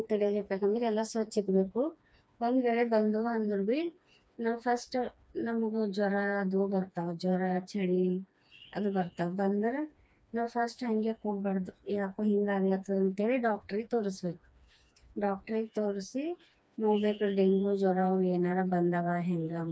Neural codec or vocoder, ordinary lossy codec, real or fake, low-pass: codec, 16 kHz, 2 kbps, FreqCodec, smaller model; none; fake; none